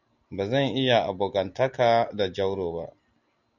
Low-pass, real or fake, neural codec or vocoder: 7.2 kHz; real; none